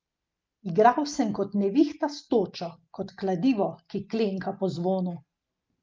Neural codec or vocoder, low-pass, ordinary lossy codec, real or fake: none; 7.2 kHz; Opus, 24 kbps; real